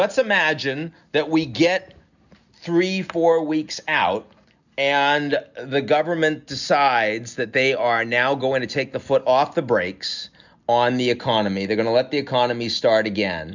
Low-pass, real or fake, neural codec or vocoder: 7.2 kHz; real; none